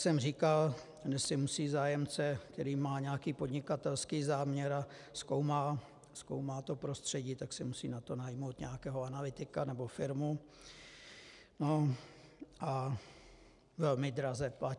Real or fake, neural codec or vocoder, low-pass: real; none; 10.8 kHz